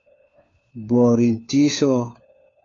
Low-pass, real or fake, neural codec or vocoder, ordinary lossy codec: 7.2 kHz; fake; codec, 16 kHz, 4 kbps, FunCodec, trained on LibriTTS, 50 frames a second; AAC, 32 kbps